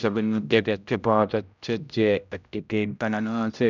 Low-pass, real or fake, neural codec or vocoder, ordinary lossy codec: 7.2 kHz; fake; codec, 16 kHz, 0.5 kbps, X-Codec, HuBERT features, trained on general audio; none